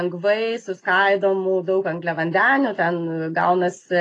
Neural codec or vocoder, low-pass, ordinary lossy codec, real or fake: none; 10.8 kHz; AAC, 32 kbps; real